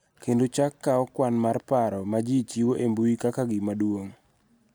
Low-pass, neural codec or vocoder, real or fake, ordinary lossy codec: none; none; real; none